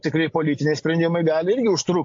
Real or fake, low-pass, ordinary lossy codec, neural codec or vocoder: real; 7.2 kHz; AAC, 48 kbps; none